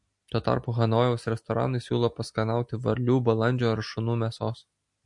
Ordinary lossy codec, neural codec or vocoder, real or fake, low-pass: MP3, 48 kbps; none; real; 10.8 kHz